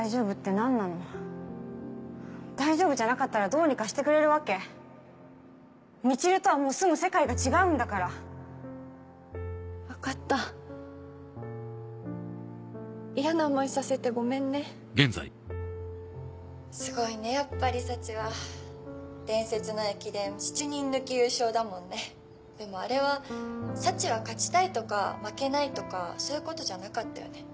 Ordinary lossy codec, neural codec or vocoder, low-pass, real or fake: none; none; none; real